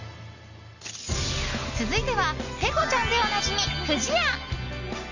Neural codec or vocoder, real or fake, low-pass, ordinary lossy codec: none; real; 7.2 kHz; none